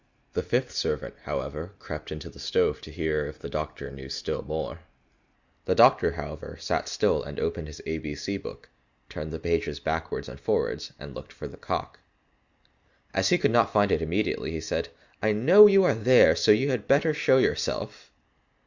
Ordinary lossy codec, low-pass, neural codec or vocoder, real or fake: Opus, 64 kbps; 7.2 kHz; none; real